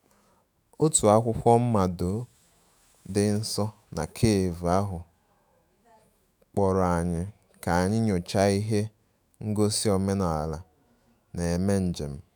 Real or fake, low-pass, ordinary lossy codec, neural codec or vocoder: fake; none; none; autoencoder, 48 kHz, 128 numbers a frame, DAC-VAE, trained on Japanese speech